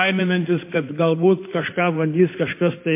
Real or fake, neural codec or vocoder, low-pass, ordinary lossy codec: fake; codec, 16 kHz in and 24 kHz out, 2.2 kbps, FireRedTTS-2 codec; 3.6 kHz; MP3, 24 kbps